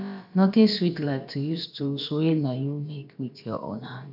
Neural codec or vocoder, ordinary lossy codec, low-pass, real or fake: codec, 16 kHz, about 1 kbps, DyCAST, with the encoder's durations; none; 5.4 kHz; fake